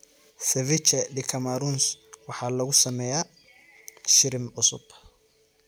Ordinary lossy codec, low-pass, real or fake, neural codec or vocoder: none; none; real; none